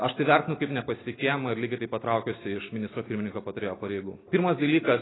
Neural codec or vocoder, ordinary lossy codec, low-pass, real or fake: none; AAC, 16 kbps; 7.2 kHz; real